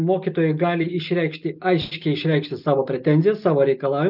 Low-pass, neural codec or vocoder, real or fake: 5.4 kHz; none; real